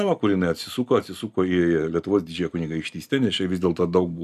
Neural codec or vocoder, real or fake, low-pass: none; real; 14.4 kHz